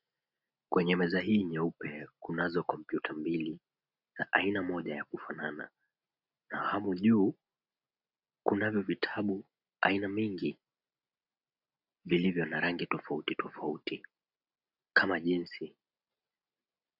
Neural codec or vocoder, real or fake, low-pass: none; real; 5.4 kHz